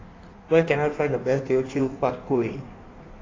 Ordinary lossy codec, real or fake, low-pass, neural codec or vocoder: AAC, 32 kbps; fake; 7.2 kHz; codec, 16 kHz in and 24 kHz out, 1.1 kbps, FireRedTTS-2 codec